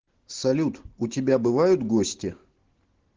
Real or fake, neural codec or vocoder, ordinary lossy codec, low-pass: real; none; Opus, 16 kbps; 7.2 kHz